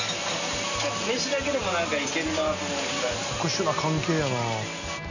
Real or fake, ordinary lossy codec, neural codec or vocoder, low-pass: real; none; none; 7.2 kHz